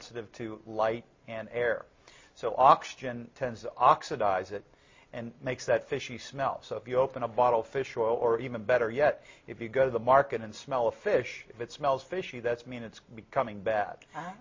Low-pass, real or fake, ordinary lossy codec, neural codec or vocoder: 7.2 kHz; real; MP3, 32 kbps; none